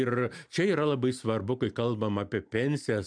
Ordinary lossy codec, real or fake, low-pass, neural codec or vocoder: MP3, 96 kbps; real; 9.9 kHz; none